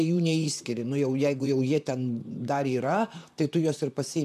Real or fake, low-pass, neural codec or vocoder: fake; 14.4 kHz; vocoder, 44.1 kHz, 128 mel bands, Pupu-Vocoder